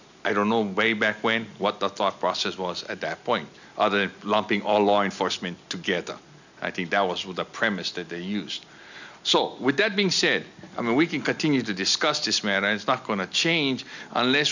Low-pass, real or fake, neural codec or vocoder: 7.2 kHz; real; none